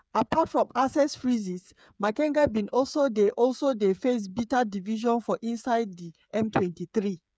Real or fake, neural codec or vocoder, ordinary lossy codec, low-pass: fake; codec, 16 kHz, 8 kbps, FreqCodec, smaller model; none; none